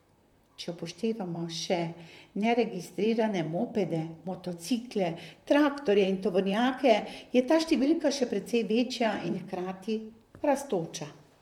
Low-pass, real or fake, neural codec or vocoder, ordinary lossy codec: 19.8 kHz; fake; vocoder, 44.1 kHz, 128 mel bands, Pupu-Vocoder; MP3, 96 kbps